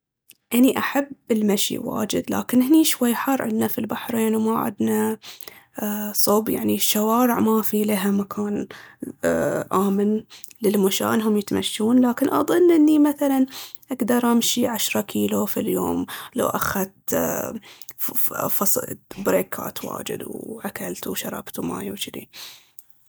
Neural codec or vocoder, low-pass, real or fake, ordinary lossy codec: none; none; real; none